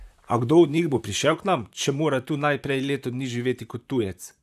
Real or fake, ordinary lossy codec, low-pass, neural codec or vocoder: fake; none; 14.4 kHz; vocoder, 44.1 kHz, 128 mel bands, Pupu-Vocoder